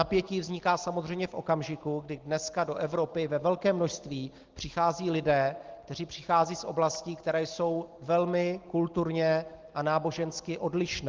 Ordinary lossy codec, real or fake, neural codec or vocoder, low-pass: Opus, 16 kbps; real; none; 7.2 kHz